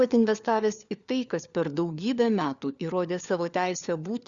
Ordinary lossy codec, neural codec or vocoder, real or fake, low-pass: Opus, 64 kbps; codec, 16 kHz, 4 kbps, FunCodec, trained on LibriTTS, 50 frames a second; fake; 7.2 kHz